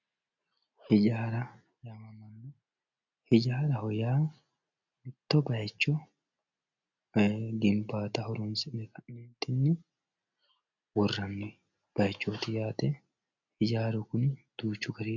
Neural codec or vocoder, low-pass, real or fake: none; 7.2 kHz; real